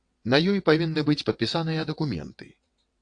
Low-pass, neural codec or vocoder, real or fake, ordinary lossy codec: 9.9 kHz; vocoder, 22.05 kHz, 80 mel bands, WaveNeXt; fake; AAC, 64 kbps